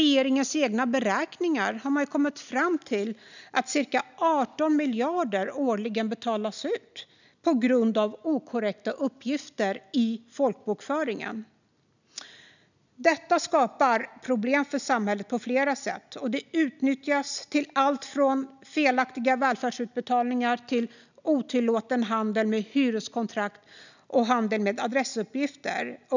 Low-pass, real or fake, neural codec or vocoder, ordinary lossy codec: 7.2 kHz; real; none; none